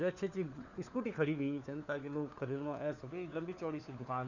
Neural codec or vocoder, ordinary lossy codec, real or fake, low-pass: codec, 16 kHz, 4 kbps, FunCodec, trained on LibriTTS, 50 frames a second; none; fake; 7.2 kHz